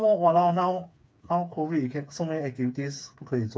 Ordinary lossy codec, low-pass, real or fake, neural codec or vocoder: none; none; fake; codec, 16 kHz, 4 kbps, FreqCodec, smaller model